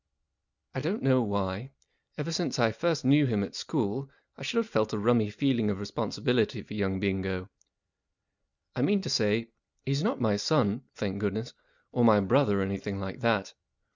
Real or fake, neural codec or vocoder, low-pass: real; none; 7.2 kHz